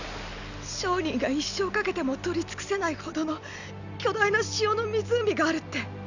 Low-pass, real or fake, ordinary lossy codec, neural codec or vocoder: 7.2 kHz; real; none; none